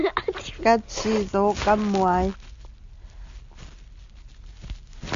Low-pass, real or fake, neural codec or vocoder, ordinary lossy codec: 7.2 kHz; real; none; MP3, 64 kbps